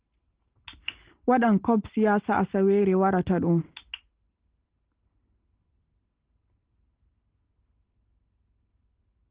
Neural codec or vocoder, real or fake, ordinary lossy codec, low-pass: none; real; Opus, 16 kbps; 3.6 kHz